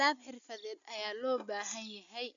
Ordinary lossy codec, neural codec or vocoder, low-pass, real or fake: none; none; 7.2 kHz; real